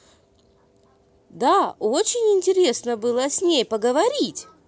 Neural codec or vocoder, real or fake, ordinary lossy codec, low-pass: none; real; none; none